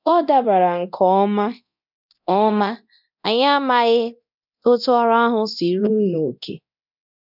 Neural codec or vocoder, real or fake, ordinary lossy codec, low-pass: codec, 24 kHz, 0.9 kbps, DualCodec; fake; none; 5.4 kHz